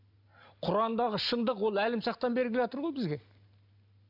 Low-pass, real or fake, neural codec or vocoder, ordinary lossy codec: 5.4 kHz; real; none; none